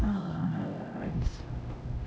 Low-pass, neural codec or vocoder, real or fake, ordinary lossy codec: none; codec, 16 kHz, 0.5 kbps, X-Codec, HuBERT features, trained on LibriSpeech; fake; none